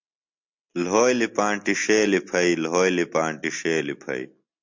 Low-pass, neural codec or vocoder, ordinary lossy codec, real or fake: 7.2 kHz; none; MP3, 48 kbps; real